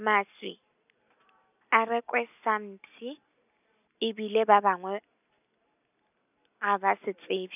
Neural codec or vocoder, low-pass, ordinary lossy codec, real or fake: none; 3.6 kHz; none; real